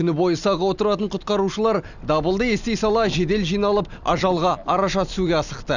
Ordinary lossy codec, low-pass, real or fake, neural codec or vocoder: none; 7.2 kHz; real; none